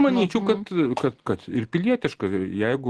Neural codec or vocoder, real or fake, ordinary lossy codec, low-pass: none; real; Opus, 16 kbps; 10.8 kHz